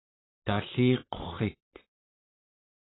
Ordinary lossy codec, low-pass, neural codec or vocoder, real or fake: AAC, 16 kbps; 7.2 kHz; vocoder, 44.1 kHz, 128 mel bands, Pupu-Vocoder; fake